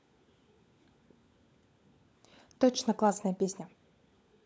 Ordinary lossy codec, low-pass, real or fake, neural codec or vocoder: none; none; fake; codec, 16 kHz, 16 kbps, FunCodec, trained on LibriTTS, 50 frames a second